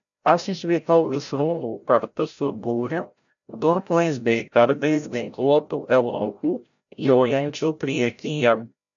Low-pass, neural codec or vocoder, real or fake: 7.2 kHz; codec, 16 kHz, 0.5 kbps, FreqCodec, larger model; fake